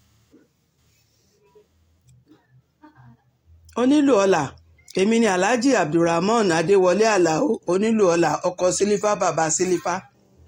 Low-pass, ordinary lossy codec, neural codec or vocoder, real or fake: 19.8 kHz; AAC, 48 kbps; none; real